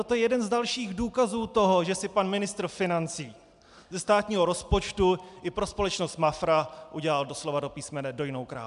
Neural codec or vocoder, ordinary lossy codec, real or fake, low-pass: none; MP3, 96 kbps; real; 10.8 kHz